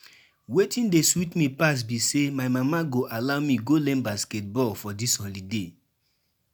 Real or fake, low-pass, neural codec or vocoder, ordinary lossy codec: real; none; none; none